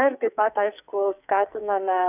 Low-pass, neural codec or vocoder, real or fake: 3.6 kHz; codec, 44.1 kHz, 2.6 kbps, SNAC; fake